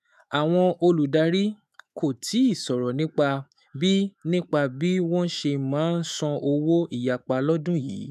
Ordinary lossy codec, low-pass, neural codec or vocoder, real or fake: none; 14.4 kHz; autoencoder, 48 kHz, 128 numbers a frame, DAC-VAE, trained on Japanese speech; fake